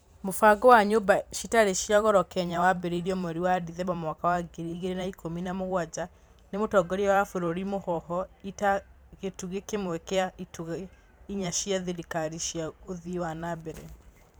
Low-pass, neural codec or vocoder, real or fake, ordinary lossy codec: none; vocoder, 44.1 kHz, 128 mel bands every 512 samples, BigVGAN v2; fake; none